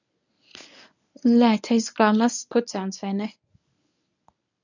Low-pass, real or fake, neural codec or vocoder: 7.2 kHz; fake; codec, 24 kHz, 0.9 kbps, WavTokenizer, medium speech release version 1